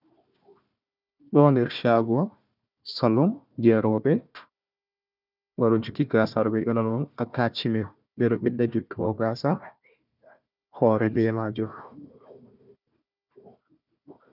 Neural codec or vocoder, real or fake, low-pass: codec, 16 kHz, 1 kbps, FunCodec, trained on Chinese and English, 50 frames a second; fake; 5.4 kHz